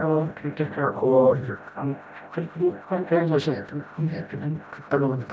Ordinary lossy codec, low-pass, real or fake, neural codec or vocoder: none; none; fake; codec, 16 kHz, 0.5 kbps, FreqCodec, smaller model